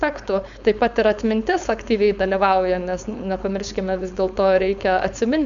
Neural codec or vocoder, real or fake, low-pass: codec, 16 kHz, 4.8 kbps, FACodec; fake; 7.2 kHz